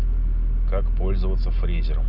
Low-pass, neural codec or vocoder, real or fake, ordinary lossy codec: 5.4 kHz; none; real; none